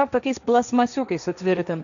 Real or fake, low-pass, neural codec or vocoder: fake; 7.2 kHz; codec, 16 kHz, 1.1 kbps, Voila-Tokenizer